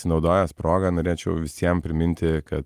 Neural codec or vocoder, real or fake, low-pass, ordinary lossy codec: none; real; 14.4 kHz; Opus, 32 kbps